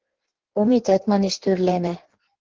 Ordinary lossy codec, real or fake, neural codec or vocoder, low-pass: Opus, 16 kbps; fake; codec, 16 kHz in and 24 kHz out, 1.1 kbps, FireRedTTS-2 codec; 7.2 kHz